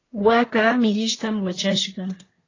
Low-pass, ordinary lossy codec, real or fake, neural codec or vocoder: 7.2 kHz; AAC, 32 kbps; fake; codec, 24 kHz, 1 kbps, SNAC